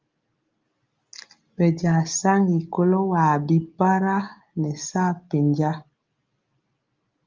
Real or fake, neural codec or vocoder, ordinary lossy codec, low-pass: real; none; Opus, 32 kbps; 7.2 kHz